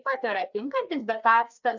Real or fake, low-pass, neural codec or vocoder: fake; 7.2 kHz; codec, 16 kHz, 2 kbps, FreqCodec, larger model